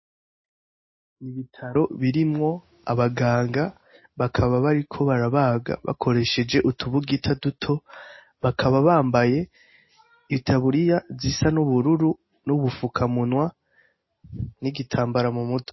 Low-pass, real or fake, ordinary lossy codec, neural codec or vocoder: 7.2 kHz; real; MP3, 24 kbps; none